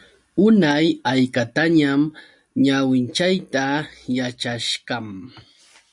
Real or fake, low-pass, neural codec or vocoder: real; 10.8 kHz; none